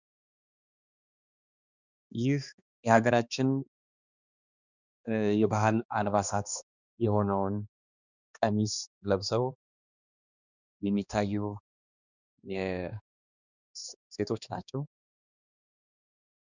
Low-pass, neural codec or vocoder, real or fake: 7.2 kHz; codec, 16 kHz, 2 kbps, X-Codec, HuBERT features, trained on balanced general audio; fake